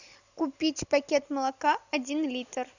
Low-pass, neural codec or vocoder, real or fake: 7.2 kHz; none; real